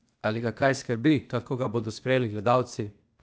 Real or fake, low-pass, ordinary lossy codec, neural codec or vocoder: fake; none; none; codec, 16 kHz, 0.8 kbps, ZipCodec